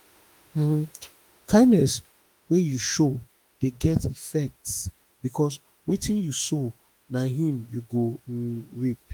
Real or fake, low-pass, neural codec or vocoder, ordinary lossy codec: fake; 19.8 kHz; autoencoder, 48 kHz, 32 numbers a frame, DAC-VAE, trained on Japanese speech; Opus, 32 kbps